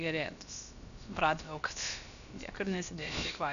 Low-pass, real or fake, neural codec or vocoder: 7.2 kHz; fake; codec, 16 kHz, about 1 kbps, DyCAST, with the encoder's durations